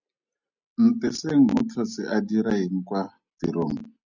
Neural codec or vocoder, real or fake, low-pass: none; real; 7.2 kHz